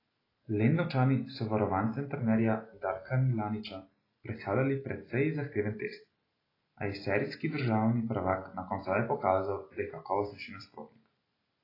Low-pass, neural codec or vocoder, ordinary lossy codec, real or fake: 5.4 kHz; none; AAC, 24 kbps; real